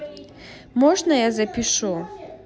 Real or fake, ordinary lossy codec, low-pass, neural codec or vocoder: real; none; none; none